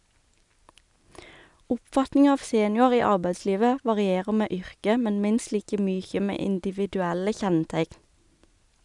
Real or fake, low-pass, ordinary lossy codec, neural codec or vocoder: real; 10.8 kHz; none; none